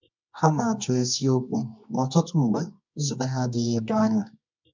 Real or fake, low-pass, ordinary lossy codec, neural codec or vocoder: fake; 7.2 kHz; MP3, 64 kbps; codec, 24 kHz, 0.9 kbps, WavTokenizer, medium music audio release